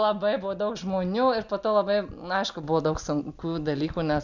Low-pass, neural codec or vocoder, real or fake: 7.2 kHz; none; real